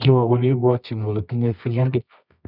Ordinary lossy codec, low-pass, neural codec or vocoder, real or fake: none; 5.4 kHz; codec, 24 kHz, 0.9 kbps, WavTokenizer, medium music audio release; fake